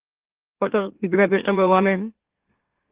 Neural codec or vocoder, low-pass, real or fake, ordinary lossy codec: autoencoder, 44.1 kHz, a latent of 192 numbers a frame, MeloTTS; 3.6 kHz; fake; Opus, 16 kbps